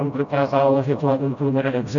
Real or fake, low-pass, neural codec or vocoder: fake; 7.2 kHz; codec, 16 kHz, 0.5 kbps, FreqCodec, smaller model